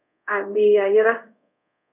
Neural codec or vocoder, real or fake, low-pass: codec, 24 kHz, 0.5 kbps, DualCodec; fake; 3.6 kHz